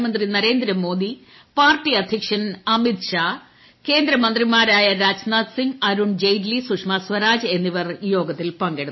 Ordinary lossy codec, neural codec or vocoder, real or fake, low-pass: MP3, 24 kbps; none; real; 7.2 kHz